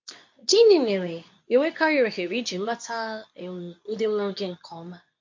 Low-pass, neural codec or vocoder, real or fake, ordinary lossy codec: 7.2 kHz; codec, 24 kHz, 0.9 kbps, WavTokenizer, medium speech release version 2; fake; MP3, 48 kbps